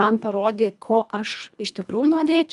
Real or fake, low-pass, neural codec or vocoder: fake; 10.8 kHz; codec, 24 kHz, 1.5 kbps, HILCodec